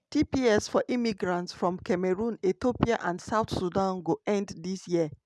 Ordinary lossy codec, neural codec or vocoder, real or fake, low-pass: none; none; real; none